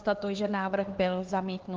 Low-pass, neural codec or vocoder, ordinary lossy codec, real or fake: 7.2 kHz; codec, 16 kHz, 4 kbps, X-Codec, HuBERT features, trained on LibriSpeech; Opus, 16 kbps; fake